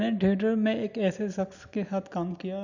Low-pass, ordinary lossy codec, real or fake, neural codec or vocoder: 7.2 kHz; none; real; none